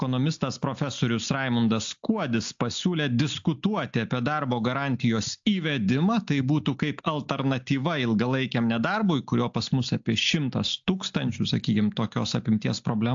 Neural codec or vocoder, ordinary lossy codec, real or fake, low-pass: none; AAC, 64 kbps; real; 7.2 kHz